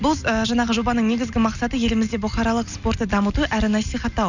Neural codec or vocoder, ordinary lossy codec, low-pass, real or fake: none; none; 7.2 kHz; real